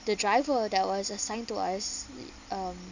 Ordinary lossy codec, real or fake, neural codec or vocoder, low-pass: none; real; none; 7.2 kHz